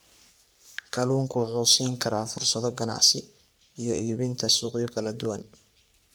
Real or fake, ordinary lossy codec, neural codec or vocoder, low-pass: fake; none; codec, 44.1 kHz, 3.4 kbps, Pupu-Codec; none